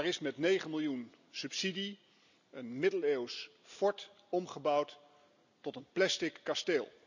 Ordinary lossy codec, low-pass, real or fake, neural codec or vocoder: none; 7.2 kHz; real; none